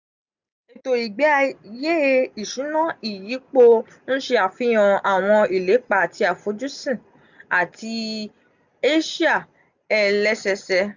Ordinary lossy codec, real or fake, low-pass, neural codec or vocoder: none; real; 7.2 kHz; none